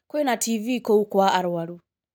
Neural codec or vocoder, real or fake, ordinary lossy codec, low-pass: none; real; none; none